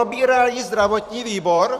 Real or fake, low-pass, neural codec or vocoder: fake; 14.4 kHz; vocoder, 44.1 kHz, 128 mel bands every 512 samples, BigVGAN v2